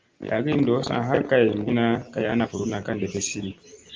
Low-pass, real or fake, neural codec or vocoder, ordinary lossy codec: 7.2 kHz; real; none; Opus, 32 kbps